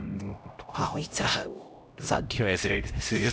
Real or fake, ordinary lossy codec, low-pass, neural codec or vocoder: fake; none; none; codec, 16 kHz, 0.5 kbps, X-Codec, HuBERT features, trained on LibriSpeech